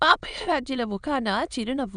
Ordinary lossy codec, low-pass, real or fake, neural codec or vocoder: none; 9.9 kHz; fake; autoencoder, 22.05 kHz, a latent of 192 numbers a frame, VITS, trained on many speakers